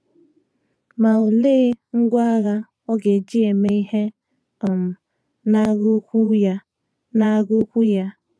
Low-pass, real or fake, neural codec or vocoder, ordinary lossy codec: none; fake; vocoder, 22.05 kHz, 80 mel bands, Vocos; none